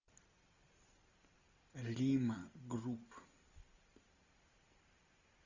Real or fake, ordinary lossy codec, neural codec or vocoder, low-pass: real; Opus, 64 kbps; none; 7.2 kHz